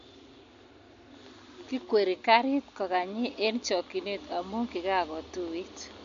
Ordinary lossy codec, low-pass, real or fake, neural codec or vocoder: MP3, 48 kbps; 7.2 kHz; real; none